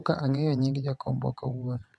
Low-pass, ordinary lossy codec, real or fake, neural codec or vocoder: none; none; fake; vocoder, 22.05 kHz, 80 mel bands, WaveNeXt